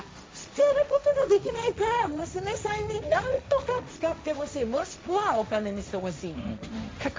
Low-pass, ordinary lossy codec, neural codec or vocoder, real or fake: none; none; codec, 16 kHz, 1.1 kbps, Voila-Tokenizer; fake